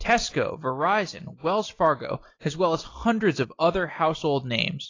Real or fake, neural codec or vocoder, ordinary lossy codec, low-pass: real; none; AAC, 32 kbps; 7.2 kHz